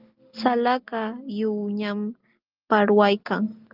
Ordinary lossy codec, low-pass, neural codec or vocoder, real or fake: Opus, 24 kbps; 5.4 kHz; none; real